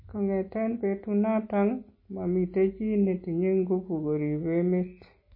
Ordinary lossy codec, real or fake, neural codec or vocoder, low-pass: MP3, 24 kbps; real; none; 5.4 kHz